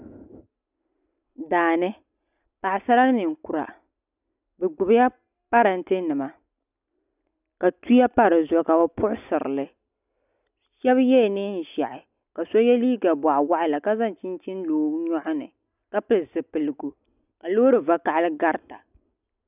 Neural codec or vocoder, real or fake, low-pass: none; real; 3.6 kHz